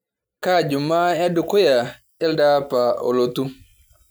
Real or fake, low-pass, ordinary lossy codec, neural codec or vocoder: real; none; none; none